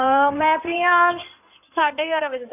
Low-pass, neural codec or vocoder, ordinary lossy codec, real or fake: 3.6 kHz; codec, 16 kHz in and 24 kHz out, 2.2 kbps, FireRedTTS-2 codec; none; fake